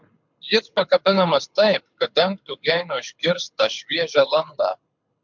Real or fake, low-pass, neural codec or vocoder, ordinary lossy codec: fake; 7.2 kHz; codec, 24 kHz, 6 kbps, HILCodec; MP3, 64 kbps